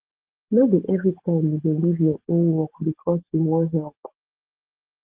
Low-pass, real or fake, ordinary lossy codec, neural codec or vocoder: 3.6 kHz; real; Opus, 16 kbps; none